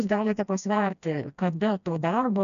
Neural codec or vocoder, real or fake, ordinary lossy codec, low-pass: codec, 16 kHz, 1 kbps, FreqCodec, smaller model; fake; MP3, 96 kbps; 7.2 kHz